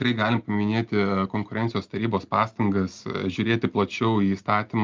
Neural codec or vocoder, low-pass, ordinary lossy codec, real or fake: none; 7.2 kHz; Opus, 24 kbps; real